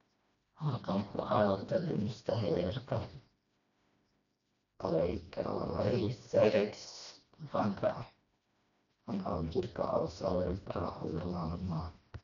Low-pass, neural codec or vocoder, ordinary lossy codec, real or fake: 7.2 kHz; codec, 16 kHz, 1 kbps, FreqCodec, smaller model; none; fake